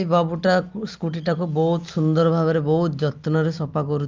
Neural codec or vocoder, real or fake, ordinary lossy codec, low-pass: none; real; Opus, 32 kbps; 7.2 kHz